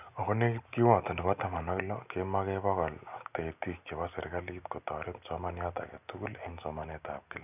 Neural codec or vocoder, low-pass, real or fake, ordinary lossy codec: none; 3.6 kHz; real; none